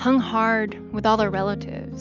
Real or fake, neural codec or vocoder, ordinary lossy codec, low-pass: real; none; Opus, 64 kbps; 7.2 kHz